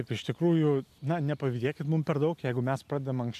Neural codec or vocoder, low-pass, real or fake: none; 14.4 kHz; real